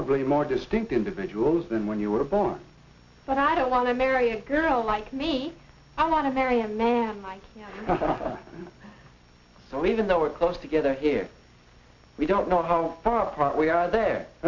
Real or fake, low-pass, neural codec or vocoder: real; 7.2 kHz; none